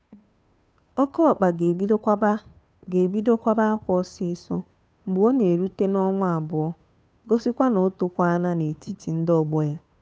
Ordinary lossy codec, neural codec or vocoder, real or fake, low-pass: none; codec, 16 kHz, 2 kbps, FunCodec, trained on Chinese and English, 25 frames a second; fake; none